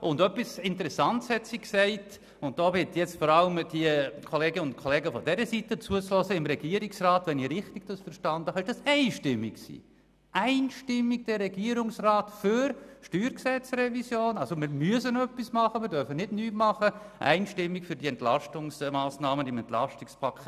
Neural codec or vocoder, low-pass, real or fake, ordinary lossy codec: none; 14.4 kHz; real; none